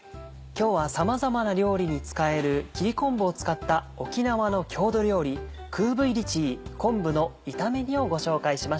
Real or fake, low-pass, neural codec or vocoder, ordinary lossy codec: real; none; none; none